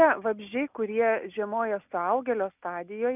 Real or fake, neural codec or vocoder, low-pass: real; none; 3.6 kHz